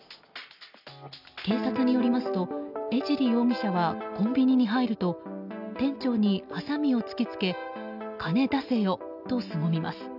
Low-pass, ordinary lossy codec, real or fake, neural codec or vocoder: 5.4 kHz; none; real; none